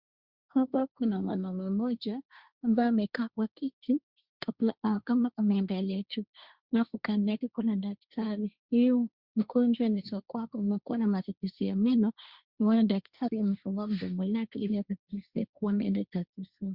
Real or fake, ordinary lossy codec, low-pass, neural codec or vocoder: fake; Opus, 64 kbps; 5.4 kHz; codec, 16 kHz, 1.1 kbps, Voila-Tokenizer